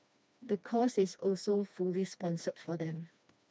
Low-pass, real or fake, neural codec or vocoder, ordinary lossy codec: none; fake; codec, 16 kHz, 2 kbps, FreqCodec, smaller model; none